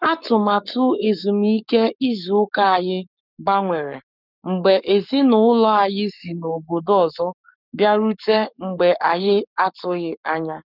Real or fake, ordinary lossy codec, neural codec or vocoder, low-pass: fake; none; codec, 44.1 kHz, 7.8 kbps, Pupu-Codec; 5.4 kHz